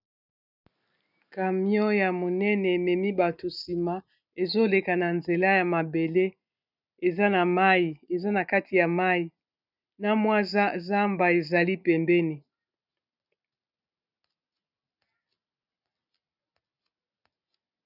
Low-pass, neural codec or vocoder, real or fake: 5.4 kHz; none; real